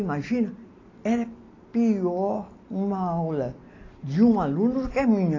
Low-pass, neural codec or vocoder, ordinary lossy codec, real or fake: 7.2 kHz; none; AAC, 32 kbps; real